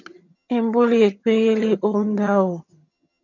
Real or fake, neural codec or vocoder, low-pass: fake; vocoder, 22.05 kHz, 80 mel bands, HiFi-GAN; 7.2 kHz